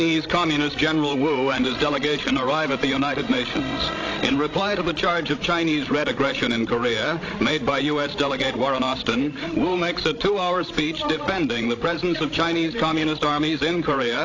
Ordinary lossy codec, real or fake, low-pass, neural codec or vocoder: AAC, 32 kbps; fake; 7.2 kHz; codec, 16 kHz, 16 kbps, FreqCodec, larger model